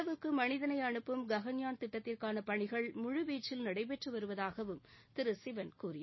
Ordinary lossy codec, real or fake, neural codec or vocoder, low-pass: MP3, 24 kbps; real; none; 7.2 kHz